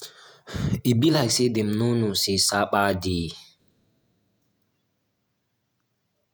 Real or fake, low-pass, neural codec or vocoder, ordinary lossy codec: fake; none; vocoder, 48 kHz, 128 mel bands, Vocos; none